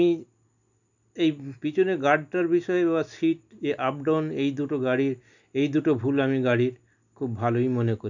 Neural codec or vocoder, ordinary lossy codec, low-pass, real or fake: none; none; 7.2 kHz; real